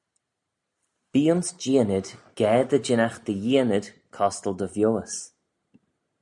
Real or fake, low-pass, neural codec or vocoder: real; 10.8 kHz; none